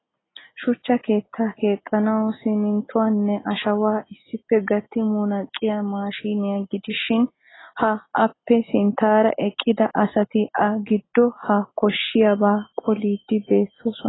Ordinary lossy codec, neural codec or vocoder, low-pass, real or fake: AAC, 16 kbps; none; 7.2 kHz; real